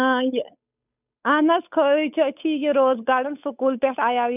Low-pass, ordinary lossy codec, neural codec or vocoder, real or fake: 3.6 kHz; none; codec, 16 kHz, 8 kbps, FunCodec, trained on Chinese and English, 25 frames a second; fake